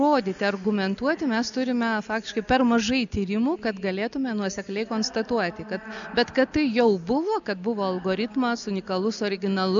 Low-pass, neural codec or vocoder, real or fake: 7.2 kHz; none; real